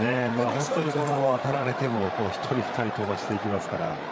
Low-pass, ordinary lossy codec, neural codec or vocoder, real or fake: none; none; codec, 16 kHz, 8 kbps, FreqCodec, larger model; fake